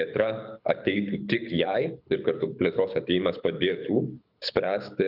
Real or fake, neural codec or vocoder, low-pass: fake; codec, 24 kHz, 6 kbps, HILCodec; 5.4 kHz